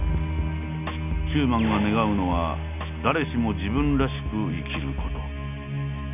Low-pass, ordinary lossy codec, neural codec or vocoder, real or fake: 3.6 kHz; none; none; real